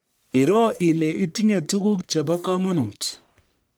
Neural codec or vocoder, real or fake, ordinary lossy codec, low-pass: codec, 44.1 kHz, 1.7 kbps, Pupu-Codec; fake; none; none